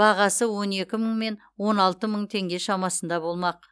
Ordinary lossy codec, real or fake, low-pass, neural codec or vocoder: none; real; none; none